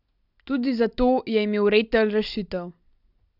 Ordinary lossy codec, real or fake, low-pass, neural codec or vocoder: none; real; 5.4 kHz; none